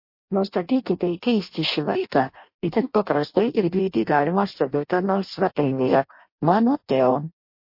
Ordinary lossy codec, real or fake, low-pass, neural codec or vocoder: MP3, 32 kbps; fake; 5.4 kHz; codec, 16 kHz in and 24 kHz out, 0.6 kbps, FireRedTTS-2 codec